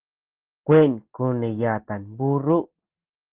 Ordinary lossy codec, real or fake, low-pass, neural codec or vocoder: Opus, 16 kbps; real; 3.6 kHz; none